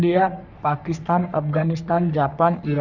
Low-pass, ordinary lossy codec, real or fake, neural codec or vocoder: 7.2 kHz; none; fake; codec, 44.1 kHz, 3.4 kbps, Pupu-Codec